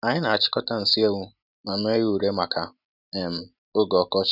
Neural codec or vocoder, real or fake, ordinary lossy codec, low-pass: none; real; none; 5.4 kHz